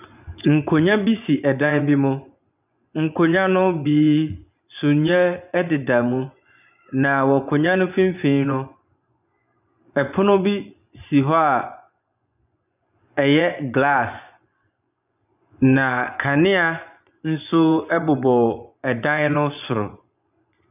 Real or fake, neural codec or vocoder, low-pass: fake; vocoder, 24 kHz, 100 mel bands, Vocos; 3.6 kHz